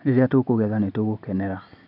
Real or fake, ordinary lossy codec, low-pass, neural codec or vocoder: real; none; 5.4 kHz; none